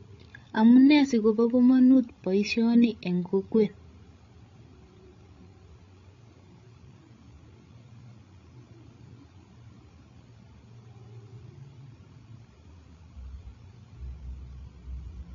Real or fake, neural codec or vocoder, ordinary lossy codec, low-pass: fake; codec, 16 kHz, 16 kbps, FreqCodec, larger model; AAC, 32 kbps; 7.2 kHz